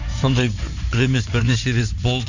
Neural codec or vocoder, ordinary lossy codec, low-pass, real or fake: codec, 16 kHz in and 24 kHz out, 2.2 kbps, FireRedTTS-2 codec; none; 7.2 kHz; fake